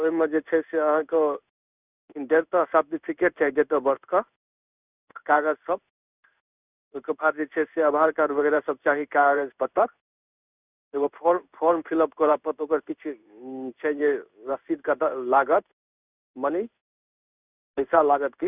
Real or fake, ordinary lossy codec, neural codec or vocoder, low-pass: fake; none; codec, 16 kHz in and 24 kHz out, 1 kbps, XY-Tokenizer; 3.6 kHz